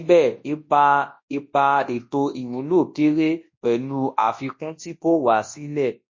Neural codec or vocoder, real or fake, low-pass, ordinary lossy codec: codec, 24 kHz, 0.9 kbps, WavTokenizer, large speech release; fake; 7.2 kHz; MP3, 32 kbps